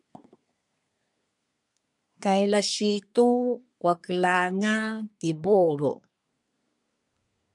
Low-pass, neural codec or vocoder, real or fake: 10.8 kHz; codec, 24 kHz, 1 kbps, SNAC; fake